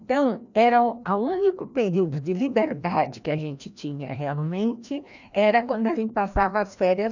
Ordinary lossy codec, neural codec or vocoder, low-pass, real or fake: none; codec, 16 kHz, 1 kbps, FreqCodec, larger model; 7.2 kHz; fake